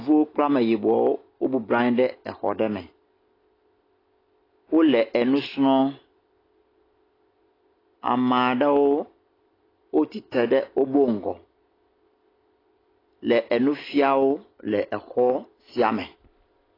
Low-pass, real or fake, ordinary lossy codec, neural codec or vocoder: 5.4 kHz; real; AAC, 24 kbps; none